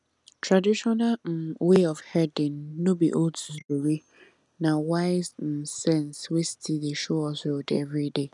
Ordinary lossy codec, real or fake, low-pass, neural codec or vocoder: none; real; 10.8 kHz; none